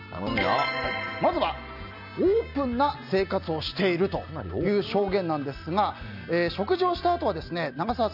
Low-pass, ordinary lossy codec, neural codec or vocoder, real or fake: 5.4 kHz; none; none; real